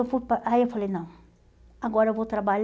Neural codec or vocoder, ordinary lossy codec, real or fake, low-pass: none; none; real; none